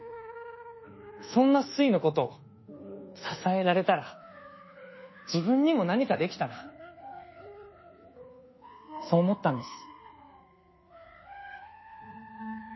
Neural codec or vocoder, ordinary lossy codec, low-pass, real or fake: codec, 24 kHz, 1.2 kbps, DualCodec; MP3, 24 kbps; 7.2 kHz; fake